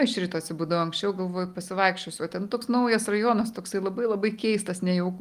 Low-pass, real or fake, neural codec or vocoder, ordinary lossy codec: 14.4 kHz; real; none; Opus, 32 kbps